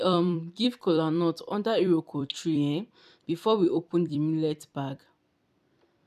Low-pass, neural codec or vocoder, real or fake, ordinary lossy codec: 14.4 kHz; vocoder, 44.1 kHz, 128 mel bands every 256 samples, BigVGAN v2; fake; none